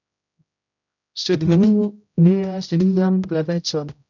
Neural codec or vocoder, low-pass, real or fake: codec, 16 kHz, 0.5 kbps, X-Codec, HuBERT features, trained on general audio; 7.2 kHz; fake